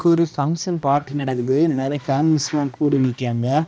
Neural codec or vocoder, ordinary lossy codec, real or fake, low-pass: codec, 16 kHz, 1 kbps, X-Codec, HuBERT features, trained on balanced general audio; none; fake; none